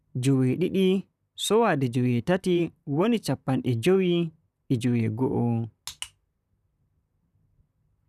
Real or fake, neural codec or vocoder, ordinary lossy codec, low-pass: fake; vocoder, 44.1 kHz, 128 mel bands, Pupu-Vocoder; none; 14.4 kHz